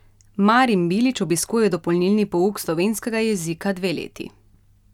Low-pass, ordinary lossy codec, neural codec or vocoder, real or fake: 19.8 kHz; none; none; real